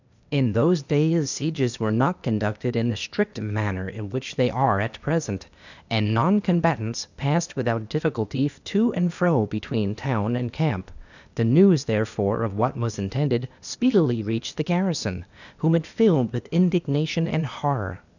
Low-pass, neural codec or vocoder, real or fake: 7.2 kHz; codec, 16 kHz, 0.8 kbps, ZipCodec; fake